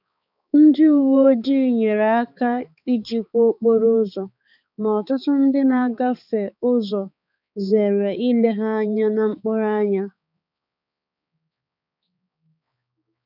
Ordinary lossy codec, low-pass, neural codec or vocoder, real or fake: none; 5.4 kHz; codec, 16 kHz, 4 kbps, X-Codec, HuBERT features, trained on balanced general audio; fake